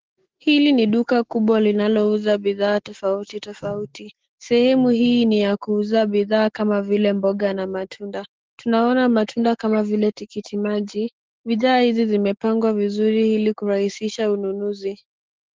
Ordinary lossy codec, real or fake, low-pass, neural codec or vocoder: Opus, 16 kbps; fake; 7.2 kHz; autoencoder, 48 kHz, 128 numbers a frame, DAC-VAE, trained on Japanese speech